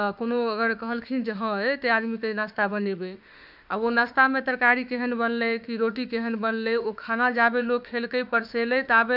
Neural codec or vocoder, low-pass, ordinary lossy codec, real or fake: autoencoder, 48 kHz, 32 numbers a frame, DAC-VAE, trained on Japanese speech; 5.4 kHz; none; fake